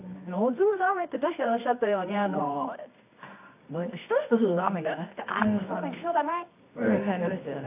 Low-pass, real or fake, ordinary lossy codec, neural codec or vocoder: 3.6 kHz; fake; AAC, 32 kbps; codec, 24 kHz, 0.9 kbps, WavTokenizer, medium music audio release